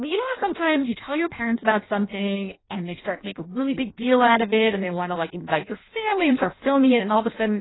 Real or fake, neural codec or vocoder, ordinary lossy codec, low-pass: fake; codec, 16 kHz in and 24 kHz out, 0.6 kbps, FireRedTTS-2 codec; AAC, 16 kbps; 7.2 kHz